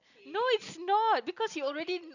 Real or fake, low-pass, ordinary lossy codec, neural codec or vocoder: real; 7.2 kHz; none; none